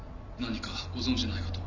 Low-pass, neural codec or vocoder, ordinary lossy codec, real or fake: 7.2 kHz; none; none; real